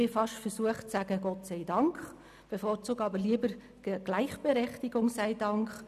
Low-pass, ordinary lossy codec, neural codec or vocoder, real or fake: 14.4 kHz; none; none; real